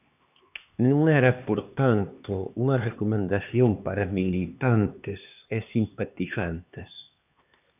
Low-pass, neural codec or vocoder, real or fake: 3.6 kHz; codec, 16 kHz, 2 kbps, X-Codec, HuBERT features, trained on LibriSpeech; fake